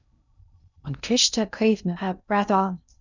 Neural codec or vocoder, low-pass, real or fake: codec, 16 kHz in and 24 kHz out, 0.6 kbps, FocalCodec, streaming, 2048 codes; 7.2 kHz; fake